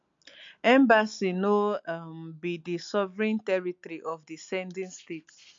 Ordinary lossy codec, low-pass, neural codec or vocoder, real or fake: MP3, 48 kbps; 7.2 kHz; none; real